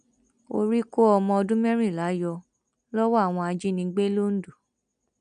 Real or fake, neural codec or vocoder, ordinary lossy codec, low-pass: real; none; none; 9.9 kHz